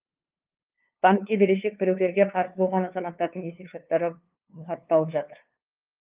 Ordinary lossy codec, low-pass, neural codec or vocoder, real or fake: Opus, 24 kbps; 3.6 kHz; codec, 16 kHz, 2 kbps, FunCodec, trained on LibriTTS, 25 frames a second; fake